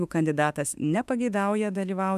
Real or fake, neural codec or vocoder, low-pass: fake; autoencoder, 48 kHz, 32 numbers a frame, DAC-VAE, trained on Japanese speech; 14.4 kHz